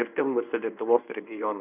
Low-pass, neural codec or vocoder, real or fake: 3.6 kHz; codec, 24 kHz, 0.9 kbps, WavTokenizer, medium speech release version 1; fake